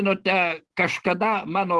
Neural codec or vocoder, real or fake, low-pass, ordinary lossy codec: none; real; 10.8 kHz; Opus, 16 kbps